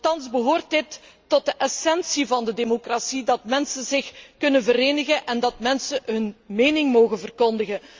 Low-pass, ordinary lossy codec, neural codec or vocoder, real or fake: 7.2 kHz; Opus, 32 kbps; none; real